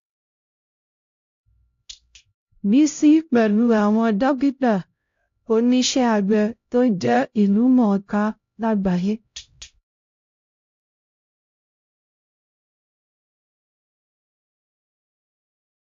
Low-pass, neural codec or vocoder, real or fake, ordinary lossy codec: 7.2 kHz; codec, 16 kHz, 0.5 kbps, X-Codec, HuBERT features, trained on LibriSpeech; fake; AAC, 48 kbps